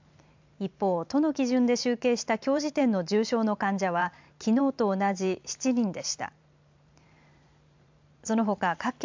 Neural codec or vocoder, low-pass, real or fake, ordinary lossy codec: vocoder, 44.1 kHz, 128 mel bands every 512 samples, BigVGAN v2; 7.2 kHz; fake; MP3, 64 kbps